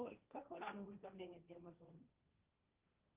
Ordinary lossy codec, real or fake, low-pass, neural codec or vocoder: Opus, 32 kbps; fake; 3.6 kHz; codec, 16 kHz, 1.1 kbps, Voila-Tokenizer